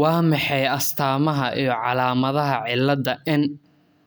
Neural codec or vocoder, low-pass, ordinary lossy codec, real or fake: none; none; none; real